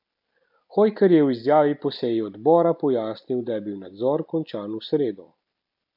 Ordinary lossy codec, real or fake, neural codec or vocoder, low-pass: none; real; none; 5.4 kHz